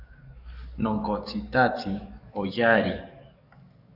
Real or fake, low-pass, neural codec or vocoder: fake; 5.4 kHz; codec, 44.1 kHz, 7.8 kbps, DAC